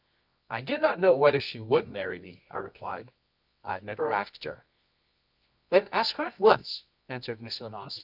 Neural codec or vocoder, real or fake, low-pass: codec, 24 kHz, 0.9 kbps, WavTokenizer, medium music audio release; fake; 5.4 kHz